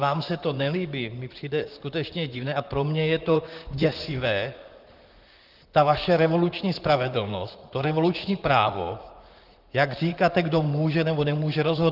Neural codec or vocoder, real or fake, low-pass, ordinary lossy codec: vocoder, 44.1 kHz, 128 mel bands, Pupu-Vocoder; fake; 5.4 kHz; Opus, 32 kbps